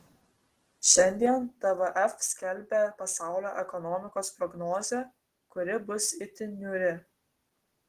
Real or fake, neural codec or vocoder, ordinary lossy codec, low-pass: real; none; Opus, 16 kbps; 14.4 kHz